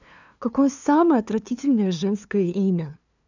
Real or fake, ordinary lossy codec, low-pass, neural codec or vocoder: fake; none; 7.2 kHz; codec, 16 kHz, 2 kbps, FunCodec, trained on LibriTTS, 25 frames a second